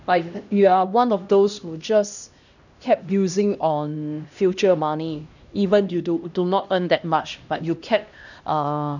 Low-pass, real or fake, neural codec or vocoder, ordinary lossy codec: 7.2 kHz; fake; codec, 16 kHz, 1 kbps, X-Codec, HuBERT features, trained on LibriSpeech; none